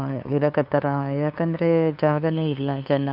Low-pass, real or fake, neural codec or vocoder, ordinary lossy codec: 5.4 kHz; fake; codec, 16 kHz, 2 kbps, FunCodec, trained on LibriTTS, 25 frames a second; AAC, 48 kbps